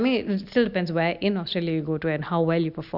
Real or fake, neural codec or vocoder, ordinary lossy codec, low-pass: real; none; none; 5.4 kHz